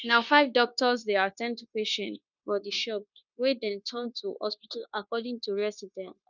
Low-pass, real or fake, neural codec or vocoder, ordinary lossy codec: 7.2 kHz; fake; codec, 16 kHz, 0.9 kbps, LongCat-Audio-Codec; none